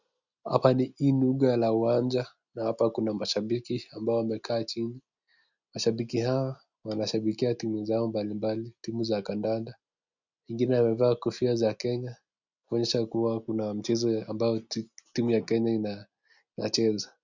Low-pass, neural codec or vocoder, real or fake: 7.2 kHz; none; real